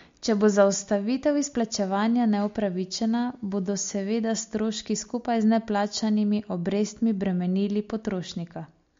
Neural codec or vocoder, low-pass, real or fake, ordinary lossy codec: none; 7.2 kHz; real; MP3, 48 kbps